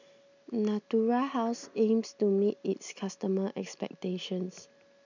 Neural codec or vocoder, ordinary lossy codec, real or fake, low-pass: none; none; real; 7.2 kHz